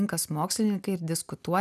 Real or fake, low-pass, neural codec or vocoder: real; 14.4 kHz; none